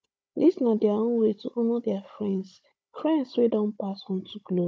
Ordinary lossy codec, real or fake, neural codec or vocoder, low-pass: none; fake; codec, 16 kHz, 16 kbps, FunCodec, trained on Chinese and English, 50 frames a second; none